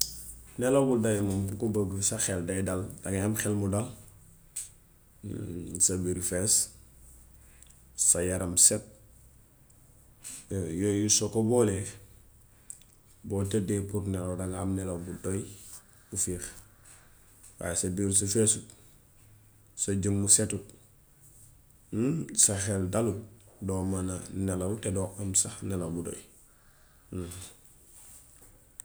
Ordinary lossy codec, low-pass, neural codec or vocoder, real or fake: none; none; none; real